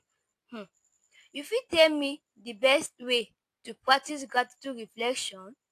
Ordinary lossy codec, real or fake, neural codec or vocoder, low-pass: AAC, 64 kbps; real; none; 14.4 kHz